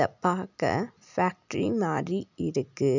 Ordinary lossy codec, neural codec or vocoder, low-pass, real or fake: none; none; 7.2 kHz; real